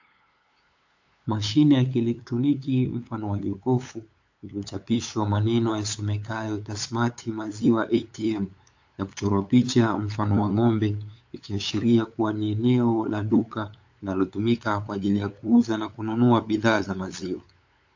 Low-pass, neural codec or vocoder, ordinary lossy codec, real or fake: 7.2 kHz; codec, 16 kHz, 8 kbps, FunCodec, trained on LibriTTS, 25 frames a second; AAC, 48 kbps; fake